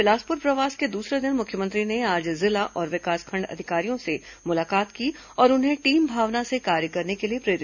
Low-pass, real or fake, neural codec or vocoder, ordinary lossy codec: 7.2 kHz; real; none; none